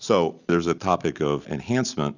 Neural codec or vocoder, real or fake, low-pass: none; real; 7.2 kHz